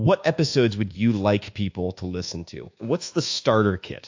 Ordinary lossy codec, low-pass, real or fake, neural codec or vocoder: AAC, 48 kbps; 7.2 kHz; fake; codec, 24 kHz, 1.2 kbps, DualCodec